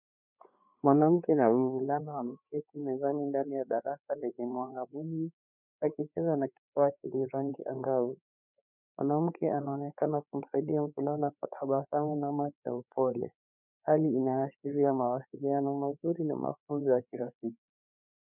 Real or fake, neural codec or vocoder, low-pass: fake; codec, 16 kHz, 4 kbps, FreqCodec, larger model; 3.6 kHz